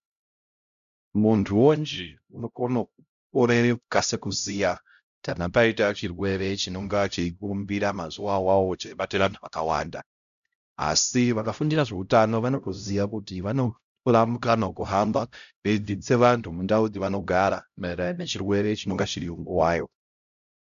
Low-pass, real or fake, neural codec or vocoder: 7.2 kHz; fake; codec, 16 kHz, 0.5 kbps, X-Codec, HuBERT features, trained on LibriSpeech